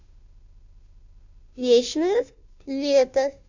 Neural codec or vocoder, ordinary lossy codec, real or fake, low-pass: codec, 16 kHz, 0.5 kbps, FunCodec, trained on Chinese and English, 25 frames a second; none; fake; 7.2 kHz